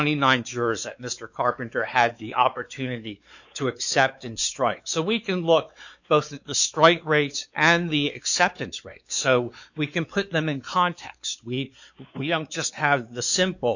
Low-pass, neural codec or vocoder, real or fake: 7.2 kHz; codec, 16 kHz, 4 kbps, X-Codec, WavLM features, trained on Multilingual LibriSpeech; fake